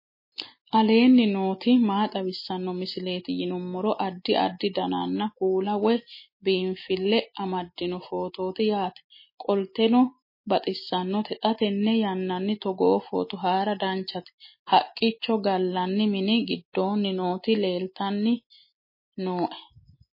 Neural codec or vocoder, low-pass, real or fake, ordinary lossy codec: none; 5.4 kHz; real; MP3, 24 kbps